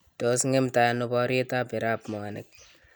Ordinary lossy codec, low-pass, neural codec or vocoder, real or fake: none; none; none; real